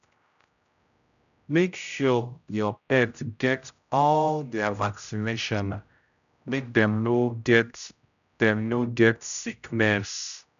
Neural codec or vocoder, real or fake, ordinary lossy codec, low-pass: codec, 16 kHz, 0.5 kbps, X-Codec, HuBERT features, trained on general audio; fake; none; 7.2 kHz